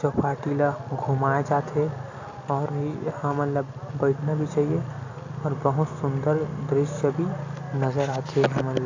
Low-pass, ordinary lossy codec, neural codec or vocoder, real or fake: 7.2 kHz; AAC, 48 kbps; none; real